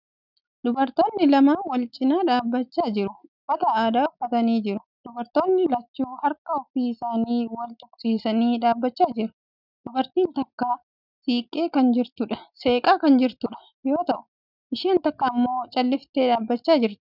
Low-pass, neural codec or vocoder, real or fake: 5.4 kHz; none; real